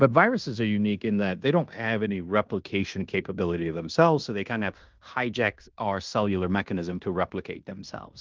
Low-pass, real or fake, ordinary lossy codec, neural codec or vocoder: 7.2 kHz; fake; Opus, 32 kbps; codec, 16 kHz in and 24 kHz out, 0.9 kbps, LongCat-Audio-Codec, fine tuned four codebook decoder